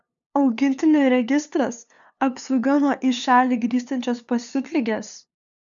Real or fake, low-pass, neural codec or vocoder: fake; 7.2 kHz; codec, 16 kHz, 2 kbps, FunCodec, trained on LibriTTS, 25 frames a second